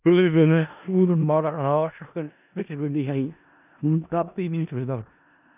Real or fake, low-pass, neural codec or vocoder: fake; 3.6 kHz; codec, 16 kHz in and 24 kHz out, 0.4 kbps, LongCat-Audio-Codec, four codebook decoder